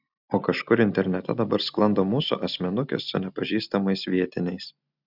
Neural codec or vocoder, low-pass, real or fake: none; 5.4 kHz; real